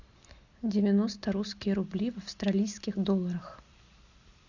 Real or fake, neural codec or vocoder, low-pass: real; none; 7.2 kHz